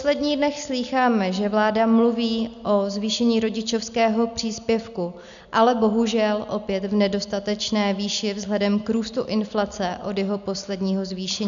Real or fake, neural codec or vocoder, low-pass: real; none; 7.2 kHz